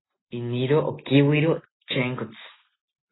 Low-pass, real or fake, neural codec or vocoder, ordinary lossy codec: 7.2 kHz; real; none; AAC, 16 kbps